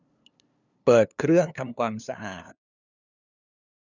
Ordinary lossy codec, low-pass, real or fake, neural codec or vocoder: none; 7.2 kHz; fake; codec, 16 kHz, 2 kbps, FunCodec, trained on LibriTTS, 25 frames a second